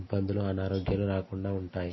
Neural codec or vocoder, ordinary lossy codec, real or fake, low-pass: none; MP3, 24 kbps; real; 7.2 kHz